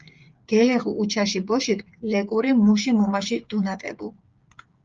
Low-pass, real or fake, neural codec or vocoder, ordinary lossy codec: 7.2 kHz; fake; codec, 16 kHz, 8 kbps, FreqCodec, smaller model; Opus, 24 kbps